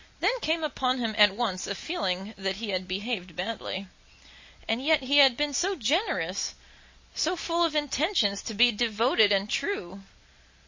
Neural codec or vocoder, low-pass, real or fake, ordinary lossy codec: none; 7.2 kHz; real; MP3, 32 kbps